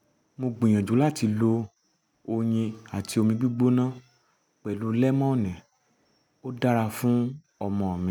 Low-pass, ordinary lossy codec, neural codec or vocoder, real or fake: 19.8 kHz; none; none; real